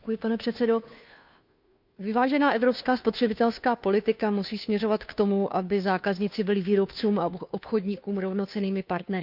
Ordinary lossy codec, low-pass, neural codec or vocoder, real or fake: none; 5.4 kHz; codec, 16 kHz, 2 kbps, FunCodec, trained on Chinese and English, 25 frames a second; fake